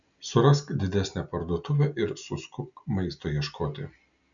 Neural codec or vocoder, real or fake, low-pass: none; real; 7.2 kHz